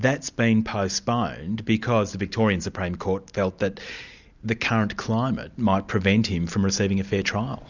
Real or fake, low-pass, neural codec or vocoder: real; 7.2 kHz; none